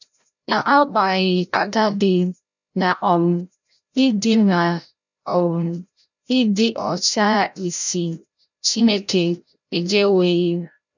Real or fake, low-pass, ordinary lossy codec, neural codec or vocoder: fake; 7.2 kHz; none; codec, 16 kHz, 0.5 kbps, FreqCodec, larger model